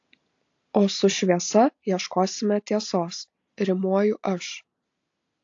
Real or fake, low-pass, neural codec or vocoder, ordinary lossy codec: real; 7.2 kHz; none; AAC, 48 kbps